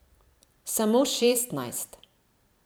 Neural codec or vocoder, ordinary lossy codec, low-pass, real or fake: vocoder, 44.1 kHz, 128 mel bands every 256 samples, BigVGAN v2; none; none; fake